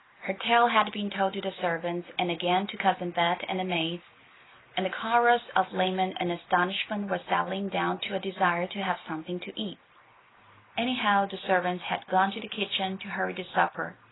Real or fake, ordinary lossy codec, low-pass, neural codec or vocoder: real; AAC, 16 kbps; 7.2 kHz; none